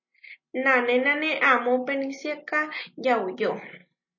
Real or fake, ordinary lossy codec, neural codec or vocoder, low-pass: real; MP3, 32 kbps; none; 7.2 kHz